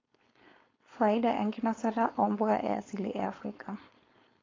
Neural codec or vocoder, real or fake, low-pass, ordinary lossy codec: codec, 16 kHz, 4.8 kbps, FACodec; fake; 7.2 kHz; AAC, 32 kbps